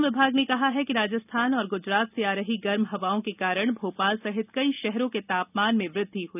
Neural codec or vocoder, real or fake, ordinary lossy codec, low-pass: none; real; none; 3.6 kHz